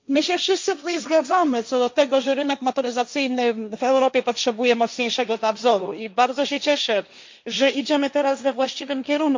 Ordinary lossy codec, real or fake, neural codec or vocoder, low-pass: MP3, 64 kbps; fake; codec, 16 kHz, 1.1 kbps, Voila-Tokenizer; 7.2 kHz